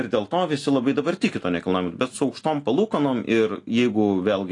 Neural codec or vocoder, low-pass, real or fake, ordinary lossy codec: none; 10.8 kHz; real; AAC, 48 kbps